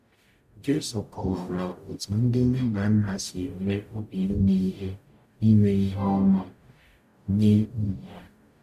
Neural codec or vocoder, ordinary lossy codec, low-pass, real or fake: codec, 44.1 kHz, 0.9 kbps, DAC; MP3, 96 kbps; 14.4 kHz; fake